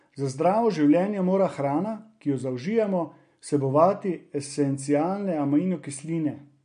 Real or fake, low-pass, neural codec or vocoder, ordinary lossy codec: real; 9.9 kHz; none; MP3, 48 kbps